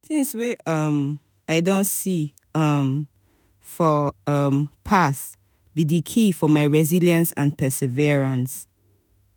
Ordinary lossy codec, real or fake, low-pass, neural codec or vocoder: none; fake; none; autoencoder, 48 kHz, 32 numbers a frame, DAC-VAE, trained on Japanese speech